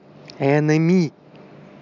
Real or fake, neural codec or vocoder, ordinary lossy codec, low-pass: real; none; none; 7.2 kHz